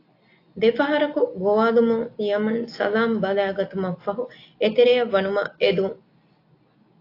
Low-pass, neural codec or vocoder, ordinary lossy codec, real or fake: 5.4 kHz; none; AAC, 32 kbps; real